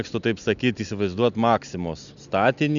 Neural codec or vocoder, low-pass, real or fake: none; 7.2 kHz; real